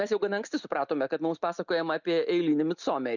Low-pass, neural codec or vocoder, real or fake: 7.2 kHz; none; real